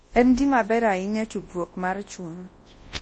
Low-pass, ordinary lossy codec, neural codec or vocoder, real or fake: 10.8 kHz; MP3, 32 kbps; codec, 24 kHz, 0.9 kbps, WavTokenizer, large speech release; fake